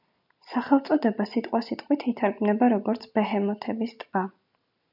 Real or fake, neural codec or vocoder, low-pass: real; none; 5.4 kHz